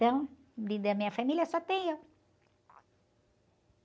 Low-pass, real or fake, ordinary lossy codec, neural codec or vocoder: none; real; none; none